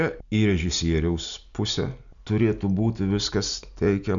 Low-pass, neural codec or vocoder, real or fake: 7.2 kHz; none; real